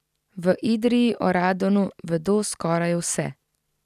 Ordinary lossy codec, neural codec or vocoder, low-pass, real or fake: none; none; 14.4 kHz; real